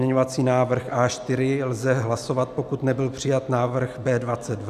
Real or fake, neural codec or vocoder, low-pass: real; none; 14.4 kHz